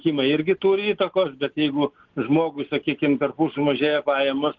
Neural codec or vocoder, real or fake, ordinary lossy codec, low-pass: none; real; Opus, 16 kbps; 7.2 kHz